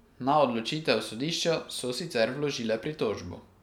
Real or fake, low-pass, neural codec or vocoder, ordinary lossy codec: real; 19.8 kHz; none; none